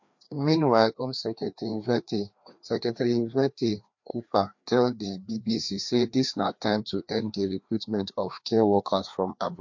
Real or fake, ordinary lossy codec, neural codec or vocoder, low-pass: fake; MP3, 48 kbps; codec, 16 kHz, 2 kbps, FreqCodec, larger model; 7.2 kHz